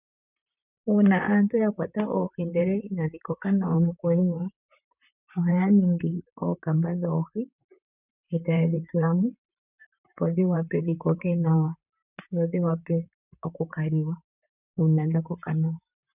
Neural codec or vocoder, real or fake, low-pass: vocoder, 44.1 kHz, 128 mel bands, Pupu-Vocoder; fake; 3.6 kHz